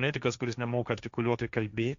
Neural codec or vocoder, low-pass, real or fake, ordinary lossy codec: codec, 16 kHz, 1.1 kbps, Voila-Tokenizer; 7.2 kHz; fake; Opus, 64 kbps